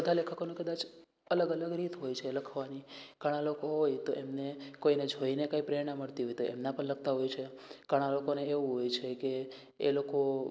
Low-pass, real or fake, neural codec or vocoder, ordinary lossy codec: none; real; none; none